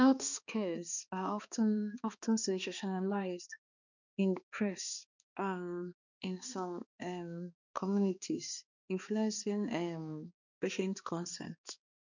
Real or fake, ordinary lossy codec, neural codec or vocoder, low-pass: fake; none; codec, 16 kHz, 2 kbps, X-Codec, HuBERT features, trained on balanced general audio; 7.2 kHz